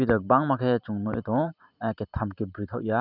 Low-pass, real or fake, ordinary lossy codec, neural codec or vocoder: 5.4 kHz; fake; none; vocoder, 44.1 kHz, 128 mel bands every 512 samples, BigVGAN v2